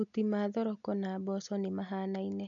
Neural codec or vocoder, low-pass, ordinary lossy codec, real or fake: none; 7.2 kHz; none; real